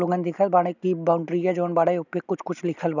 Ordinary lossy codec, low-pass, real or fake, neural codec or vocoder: none; 7.2 kHz; real; none